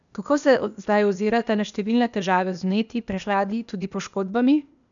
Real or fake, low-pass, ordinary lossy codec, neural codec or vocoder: fake; 7.2 kHz; none; codec, 16 kHz, 0.8 kbps, ZipCodec